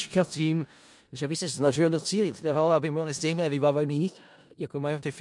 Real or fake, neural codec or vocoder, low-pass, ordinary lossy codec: fake; codec, 16 kHz in and 24 kHz out, 0.4 kbps, LongCat-Audio-Codec, four codebook decoder; 10.8 kHz; MP3, 64 kbps